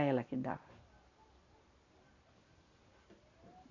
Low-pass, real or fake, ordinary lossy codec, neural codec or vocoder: 7.2 kHz; real; none; none